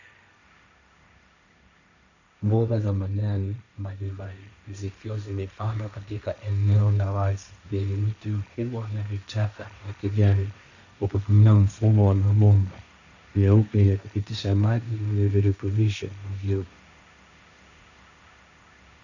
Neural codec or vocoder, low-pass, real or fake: codec, 16 kHz, 1.1 kbps, Voila-Tokenizer; 7.2 kHz; fake